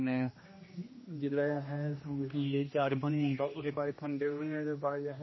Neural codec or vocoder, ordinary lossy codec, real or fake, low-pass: codec, 16 kHz, 1 kbps, X-Codec, HuBERT features, trained on general audio; MP3, 24 kbps; fake; 7.2 kHz